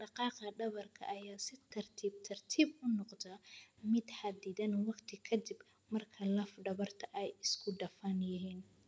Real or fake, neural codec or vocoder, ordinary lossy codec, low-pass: real; none; none; none